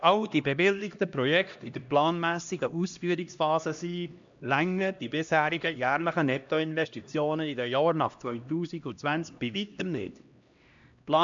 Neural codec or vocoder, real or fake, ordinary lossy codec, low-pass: codec, 16 kHz, 1 kbps, X-Codec, HuBERT features, trained on LibriSpeech; fake; MP3, 64 kbps; 7.2 kHz